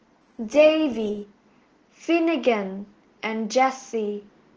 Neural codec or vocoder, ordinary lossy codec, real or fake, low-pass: none; Opus, 16 kbps; real; 7.2 kHz